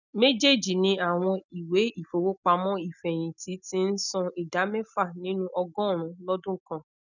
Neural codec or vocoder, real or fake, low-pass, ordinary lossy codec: none; real; 7.2 kHz; none